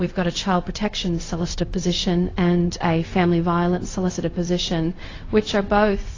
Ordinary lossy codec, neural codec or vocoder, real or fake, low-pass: AAC, 32 kbps; codec, 16 kHz, 0.4 kbps, LongCat-Audio-Codec; fake; 7.2 kHz